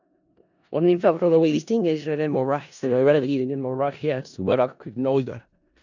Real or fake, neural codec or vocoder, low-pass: fake; codec, 16 kHz in and 24 kHz out, 0.4 kbps, LongCat-Audio-Codec, four codebook decoder; 7.2 kHz